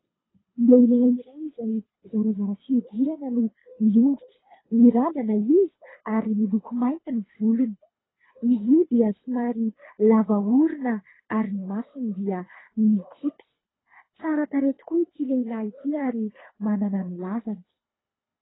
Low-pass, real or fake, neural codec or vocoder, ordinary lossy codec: 7.2 kHz; fake; codec, 24 kHz, 3 kbps, HILCodec; AAC, 16 kbps